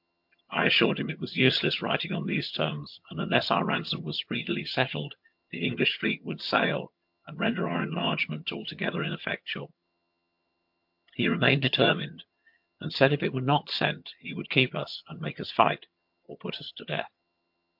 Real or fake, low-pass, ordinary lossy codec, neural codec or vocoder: fake; 5.4 kHz; MP3, 48 kbps; vocoder, 22.05 kHz, 80 mel bands, HiFi-GAN